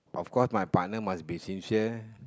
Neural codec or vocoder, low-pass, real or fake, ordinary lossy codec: none; none; real; none